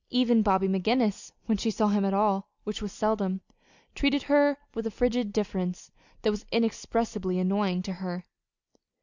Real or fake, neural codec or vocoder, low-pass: real; none; 7.2 kHz